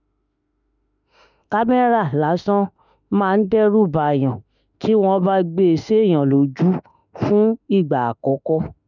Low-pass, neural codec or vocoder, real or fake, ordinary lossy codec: 7.2 kHz; autoencoder, 48 kHz, 32 numbers a frame, DAC-VAE, trained on Japanese speech; fake; none